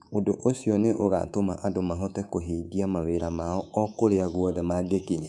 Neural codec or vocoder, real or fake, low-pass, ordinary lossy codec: codec, 24 kHz, 3.1 kbps, DualCodec; fake; none; none